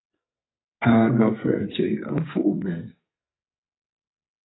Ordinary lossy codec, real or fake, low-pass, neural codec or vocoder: AAC, 16 kbps; fake; 7.2 kHz; codec, 44.1 kHz, 2.6 kbps, SNAC